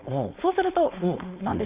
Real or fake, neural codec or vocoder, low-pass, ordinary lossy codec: fake; codec, 16 kHz, 4.8 kbps, FACodec; 3.6 kHz; Opus, 64 kbps